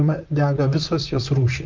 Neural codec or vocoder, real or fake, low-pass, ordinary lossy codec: vocoder, 44.1 kHz, 128 mel bands, Pupu-Vocoder; fake; 7.2 kHz; Opus, 32 kbps